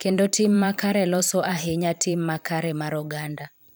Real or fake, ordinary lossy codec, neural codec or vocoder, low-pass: fake; none; vocoder, 44.1 kHz, 128 mel bands every 512 samples, BigVGAN v2; none